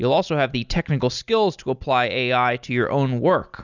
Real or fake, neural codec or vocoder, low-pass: real; none; 7.2 kHz